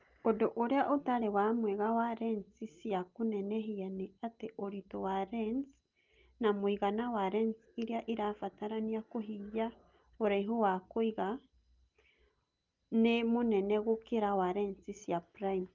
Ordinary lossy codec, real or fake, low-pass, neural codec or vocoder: Opus, 32 kbps; real; 7.2 kHz; none